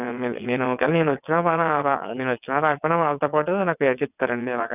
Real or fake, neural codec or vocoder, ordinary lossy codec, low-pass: fake; vocoder, 22.05 kHz, 80 mel bands, WaveNeXt; none; 3.6 kHz